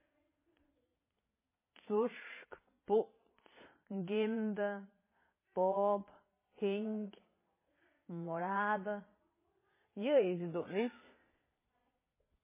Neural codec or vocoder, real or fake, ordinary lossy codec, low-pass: vocoder, 22.05 kHz, 80 mel bands, Vocos; fake; MP3, 16 kbps; 3.6 kHz